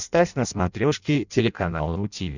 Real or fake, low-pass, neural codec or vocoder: fake; 7.2 kHz; codec, 16 kHz in and 24 kHz out, 0.6 kbps, FireRedTTS-2 codec